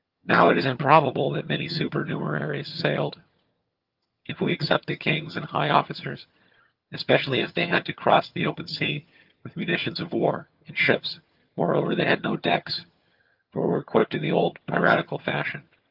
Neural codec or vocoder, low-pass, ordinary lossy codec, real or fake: vocoder, 22.05 kHz, 80 mel bands, HiFi-GAN; 5.4 kHz; Opus, 24 kbps; fake